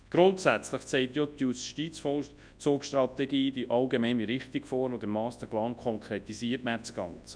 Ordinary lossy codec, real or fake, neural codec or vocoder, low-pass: none; fake; codec, 24 kHz, 0.9 kbps, WavTokenizer, large speech release; 9.9 kHz